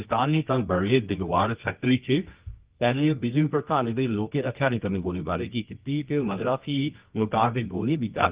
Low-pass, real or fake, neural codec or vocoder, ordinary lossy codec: 3.6 kHz; fake; codec, 24 kHz, 0.9 kbps, WavTokenizer, medium music audio release; Opus, 32 kbps